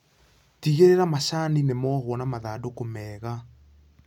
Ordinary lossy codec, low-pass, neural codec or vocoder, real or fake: none; 19.8 kHz; none; real